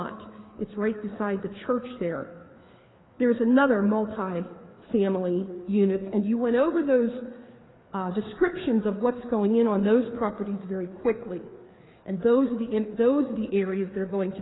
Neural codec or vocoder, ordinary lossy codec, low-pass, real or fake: codec, 16 kHz, 4 kbps, FreqCodec, larger model; AAC, 16 kbps; 7.2 kHz; fake